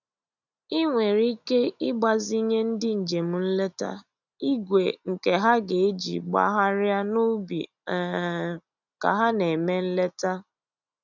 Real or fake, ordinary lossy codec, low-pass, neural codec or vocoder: real; none; 7.2 kHz; none